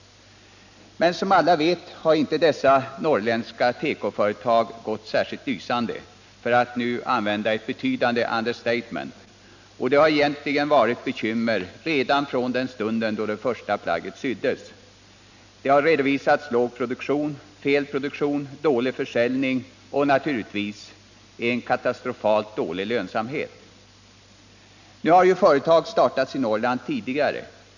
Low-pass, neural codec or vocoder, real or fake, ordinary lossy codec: 7.2 kHz; none; real; none